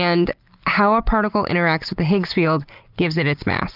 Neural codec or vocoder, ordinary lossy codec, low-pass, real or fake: none; Opus, 32 kbps; 5.4 kHz; real